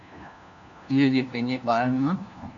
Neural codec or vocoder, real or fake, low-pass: codec, 16 kHz, 1 kbps, FunCodec, trained on LibriTTS, 50 frames a second; fake; 7.2 kHz